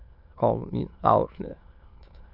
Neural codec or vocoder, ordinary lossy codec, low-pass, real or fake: autoencoder, 22.05 kHz, a latent of 192 numbers a frame, VITS, trained on many speakers; MP3, 48 kbps; 5.4 kHz; fake